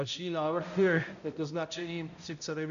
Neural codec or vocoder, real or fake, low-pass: codec, 16 kHz, 0.5 kbps, X-Codec, HuBERT features, trained on balanced general audio; fake; 7.2 kHz